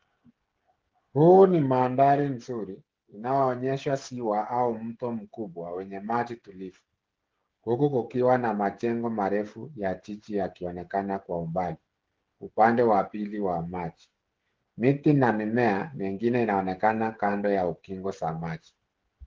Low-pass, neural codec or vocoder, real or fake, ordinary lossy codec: 7.2 kHz; codec, 16 kHz, 16 kbps, FreqCodec, smaller model; fake; Opus, 16 kbps